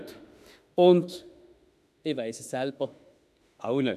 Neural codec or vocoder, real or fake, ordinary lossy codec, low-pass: autoencoder, 48 kHz, 32 numbers a frame, DAC-VAE, trained on Japanese speech; fake; none; 14.4 kHz